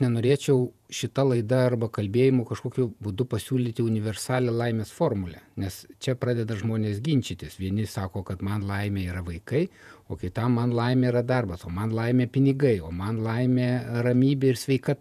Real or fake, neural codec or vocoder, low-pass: fake; vocoder, 48 kHz, 128 mel bands, Vocos; 14.4 kHz